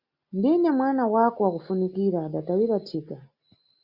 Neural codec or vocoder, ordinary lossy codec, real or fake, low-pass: none; Opus, 64 kbps; real; 5.4 kHz